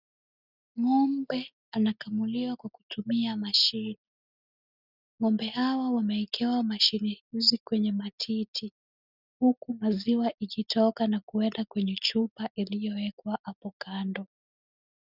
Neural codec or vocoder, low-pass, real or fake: none; 5.4 kHz; real